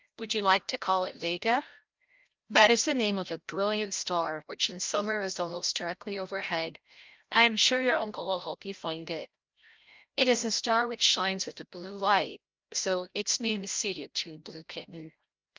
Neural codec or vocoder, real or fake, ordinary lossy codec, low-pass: codec, 16 kHz, 0.5 kbps, FreqCodec, larger model; fake; Opus, 24 kbps; 7.2 kHz